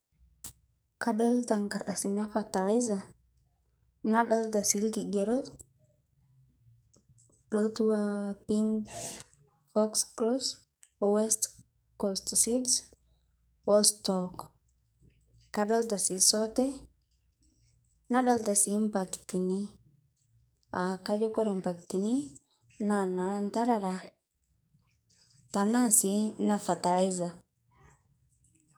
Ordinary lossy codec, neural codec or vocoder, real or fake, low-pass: none; codec, 44.1 kHz, 2.6 kbps, SNAC; fake; none